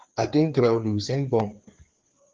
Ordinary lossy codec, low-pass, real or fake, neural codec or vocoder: Opus, 16 kbps; 7.2 kHz; fake; codec, 16 kHz, 4 kbps, FreqCodec, larger model